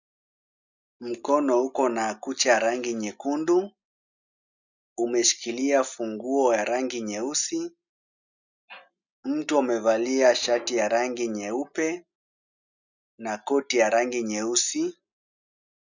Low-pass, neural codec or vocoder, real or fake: 7.2 kHz; none; real